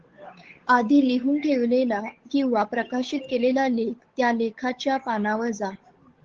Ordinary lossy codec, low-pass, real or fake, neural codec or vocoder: Opus, 16 kbps; 7.2 kHz; fake; codec, 16 kHz, 8 kbps, FunCodec, trained on Chinese and English, 25 frames a second